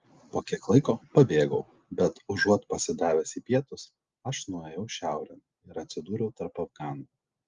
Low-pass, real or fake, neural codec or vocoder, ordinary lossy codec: 7.2 kHz; real; none; Opus, 32 kbps